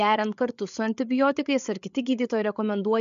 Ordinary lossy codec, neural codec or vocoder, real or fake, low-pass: MP3, 64 kbps; codec, 16 kHz, 8 kbps, FreqCodec, larger model; fake; 7.2 kHz